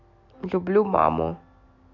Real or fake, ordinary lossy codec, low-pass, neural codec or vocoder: real; MP3, 48 kbps; 7.2 kHz; none